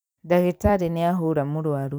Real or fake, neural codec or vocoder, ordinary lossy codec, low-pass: fake; vocoder, 44.1 kHz, 128 mel bands every 256 samples, BigVGAN v2; none; none